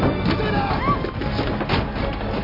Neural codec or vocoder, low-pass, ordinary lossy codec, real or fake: none; 5.4 kHz; none; real